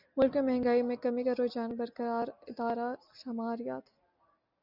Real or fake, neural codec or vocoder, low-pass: real; none; 5.4 kHz